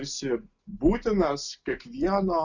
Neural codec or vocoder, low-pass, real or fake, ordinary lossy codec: none; 7.2 kHz; real; Opus, 64 kbps